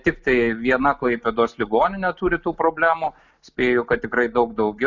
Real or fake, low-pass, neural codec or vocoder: real; 7.2 kHz; none